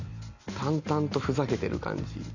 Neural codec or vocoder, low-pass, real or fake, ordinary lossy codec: none; 7.2 kHz; real; none